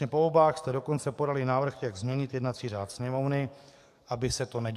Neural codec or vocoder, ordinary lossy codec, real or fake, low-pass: codec, 44.1 kHz, 7.8 kbps, DAC; AAC, 96 kbps; fake; 14.4 kHz